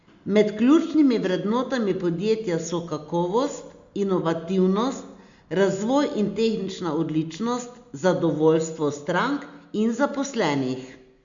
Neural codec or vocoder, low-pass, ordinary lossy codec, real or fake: none; 7.2 kHz; Opus, 64 kbps; real